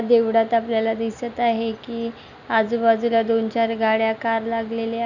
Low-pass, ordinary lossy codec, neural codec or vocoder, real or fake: 7.2 kHz; none; none; real